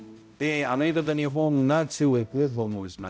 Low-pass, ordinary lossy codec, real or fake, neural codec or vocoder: none; none; fake; codec, 16 kHz, 0.5 kbps, X-Codec, HuBERT features, trained on balanced general audio